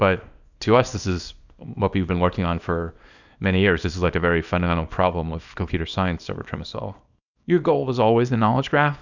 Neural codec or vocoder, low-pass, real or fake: codec, 24 kHz, 0.9 kbps, WavTokenizer, small release; 7.2 kHz; fake